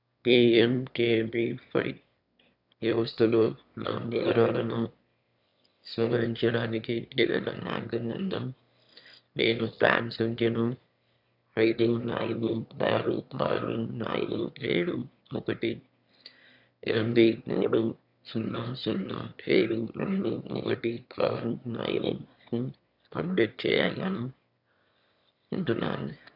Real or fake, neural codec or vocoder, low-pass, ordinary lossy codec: fake; autoencoder, 22.05 kHz, a latent of 192 numbers a frame, VITS, trained on one speaker; 5.4 kHz; none